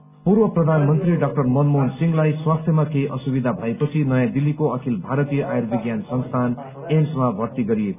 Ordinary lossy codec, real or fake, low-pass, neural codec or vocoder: none; real; 3.6 kHz; none